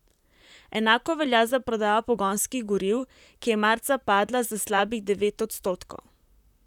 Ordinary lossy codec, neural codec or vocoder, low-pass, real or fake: none; vocoder, 44.1 kHz, 128 mel bands, Pupu-Vocoder; 19.8 kHz; fake